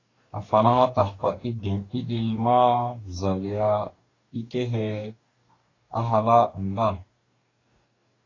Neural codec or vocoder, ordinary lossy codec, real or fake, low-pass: codec, 44.1 kHz, 2.6 kbps, DAC; AAC, 32 kbps; fake; 7.2 kHz